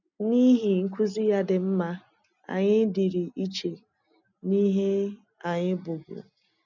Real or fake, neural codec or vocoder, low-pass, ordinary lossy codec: real; none; 7.2 kHz; none